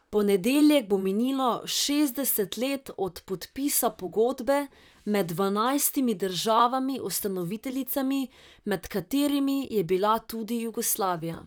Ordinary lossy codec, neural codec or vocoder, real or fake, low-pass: none; vocoder, 44.1 kHz, 128 mel bands, Pupu-Vocoder; fake; none